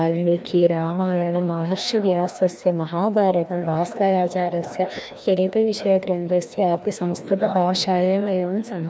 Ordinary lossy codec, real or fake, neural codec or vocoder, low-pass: none; fake; codec, 16 kHz, 1 kbps, FreqCodec, larger model; none